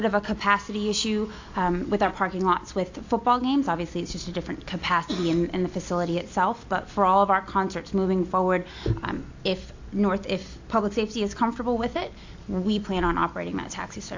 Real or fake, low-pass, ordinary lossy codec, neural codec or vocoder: real; 7.2 kHz; AAC, 48 kbps; none